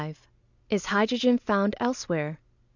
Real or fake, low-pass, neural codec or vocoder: real; 7.2 kHz; none